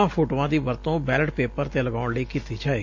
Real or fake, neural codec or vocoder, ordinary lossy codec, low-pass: real; none; AAC, 48 kbps; 7.2 kHz